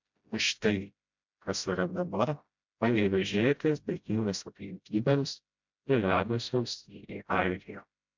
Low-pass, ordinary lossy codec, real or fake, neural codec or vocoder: 7.2 kHz; MP3, 64 kbps; fake; codec, 16 kHz, 0.5 kbps, FreqCodec, smaller model